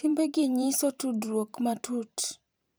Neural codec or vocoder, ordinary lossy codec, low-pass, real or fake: vocoder, 44.1 kHz, 128 mel bands every 512 samples, BigVGAN v2; none; none; fake